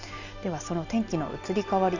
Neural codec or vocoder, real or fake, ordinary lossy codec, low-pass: none; real; none; 7.2 kHz